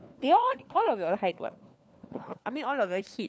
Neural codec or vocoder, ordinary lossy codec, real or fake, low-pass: codec, 16 kHz, 4 kbps, FunCodec, trained on LibriTTS, 50 frames a second; none; fake; none